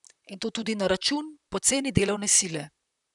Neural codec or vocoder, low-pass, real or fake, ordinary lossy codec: vocoder, 44.1 kHz, 128 mel bands, Pupu-Vocoder; 10.8 kHz; fake; none